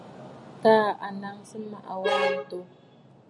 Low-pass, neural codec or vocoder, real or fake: 10.8 kHz; none; real